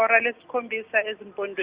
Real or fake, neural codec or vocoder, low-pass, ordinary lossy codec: real; none; 3.6 kHz; Opus, 64 kbps